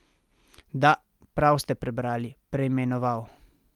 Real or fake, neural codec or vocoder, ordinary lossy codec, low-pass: real; none; Opus, 32 kbps; 19.8 kHz